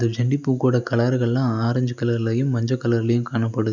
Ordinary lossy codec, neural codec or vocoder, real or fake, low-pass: none; none; real; 7.2 kHz